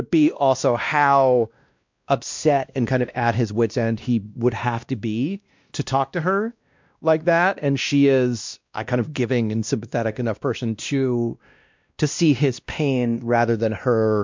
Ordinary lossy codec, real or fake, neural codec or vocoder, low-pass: MP3, 64 kbps; fake; codec, 16 kHz, 1 kbps, X-Codec, WavLM features, trained on Multilingual LibriSpeech; 7.2 kHz